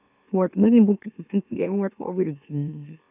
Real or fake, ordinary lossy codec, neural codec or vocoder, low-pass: fake; none; autoencoder, 44.1 kHz, a latent of 192 numbers a frame, MeloTTS; 3.6 kHz